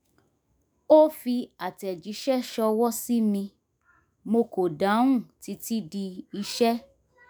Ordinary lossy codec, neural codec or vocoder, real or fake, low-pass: none; autoencoder, 48 kHz, 128 numbers a frame, DAC-VAE, trained on Japanese speech; fake; none